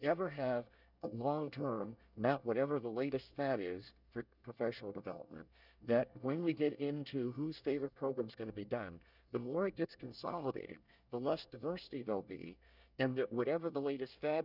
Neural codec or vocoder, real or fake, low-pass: codec, 24 kHz, 1 kbps, SNAC; fake; 5.4 kHz